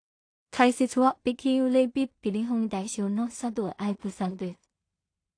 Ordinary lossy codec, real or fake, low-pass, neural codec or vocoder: AAC, 48 kbps; fake; 9.9 kHz; codec, 16 kHz in and 24 kHz out, 0.4 kbps, LongCat-Audio-Codec, two codebook decoder